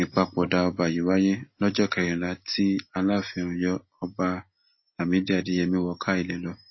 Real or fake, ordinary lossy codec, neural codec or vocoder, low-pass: real; MP3, 24 kbps; none; 7.2 kHz